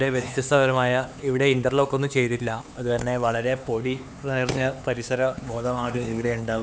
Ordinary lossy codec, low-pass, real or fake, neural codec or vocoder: none; none; fake; codec, 16 kHz, 4 kbps, X-Codec, HuBERT features, trained on LibriSpeech